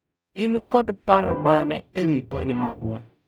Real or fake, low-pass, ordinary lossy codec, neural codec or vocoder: fake; none; none; codec, 44.1 kHz, 0.9 kbps, DAC